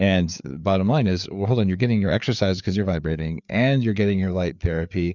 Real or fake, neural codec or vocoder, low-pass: fake; codec, 16 kHz, 4 kbps, FreqCodec, larger model; 7.2 kHz